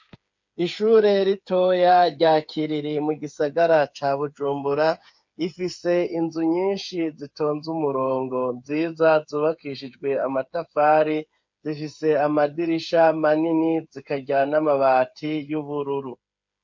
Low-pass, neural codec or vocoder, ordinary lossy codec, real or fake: 7.2 kHz; codec, 16 kHz, 8 kbps, FreqCodec, smaller model; MP3, 48 kbps; fake